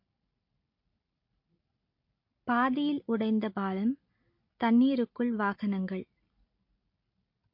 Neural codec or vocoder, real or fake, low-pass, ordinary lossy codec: none; real; 5.4 kHz; MP3, 32 kbps